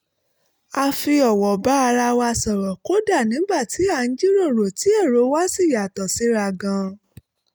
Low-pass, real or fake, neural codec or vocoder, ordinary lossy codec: none; real; none; none